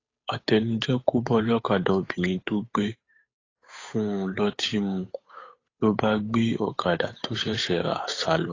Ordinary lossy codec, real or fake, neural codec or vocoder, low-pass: AAC, 32 kbps; fake; codec, 16 kHz, 8 kbps, FunCodec, trained on Chinese and English, 25 frames a second; 7.2 kHz